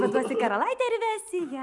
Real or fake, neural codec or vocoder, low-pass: real; none; 10.8 kHz